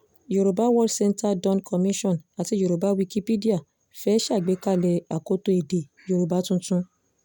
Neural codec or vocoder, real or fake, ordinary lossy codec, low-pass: none; real; none; none